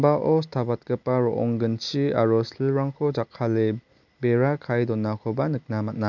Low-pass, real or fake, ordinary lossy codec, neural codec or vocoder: 7.2 kHz; real; none; none